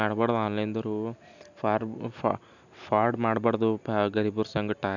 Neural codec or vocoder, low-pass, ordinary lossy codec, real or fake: none; 7.2 kHz; none; real